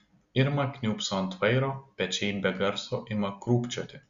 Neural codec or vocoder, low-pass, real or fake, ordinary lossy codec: none; 7.2 kHz; real; Opus, 64 kbps